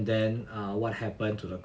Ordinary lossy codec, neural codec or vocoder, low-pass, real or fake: none; none; none; real